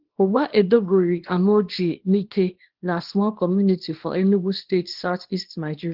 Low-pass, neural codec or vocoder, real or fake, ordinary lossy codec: 5.4 kHz; codec, 24 kHz, 0.9 kbps, WavTokenizer, small release; fake; Opus, 16 kbps